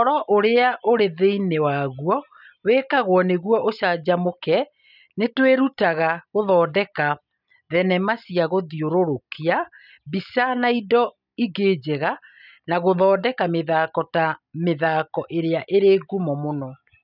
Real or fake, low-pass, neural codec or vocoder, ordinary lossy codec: real; 5.4 kHz; none; none